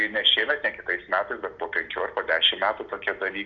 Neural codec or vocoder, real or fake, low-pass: none; real; 7.2 kHz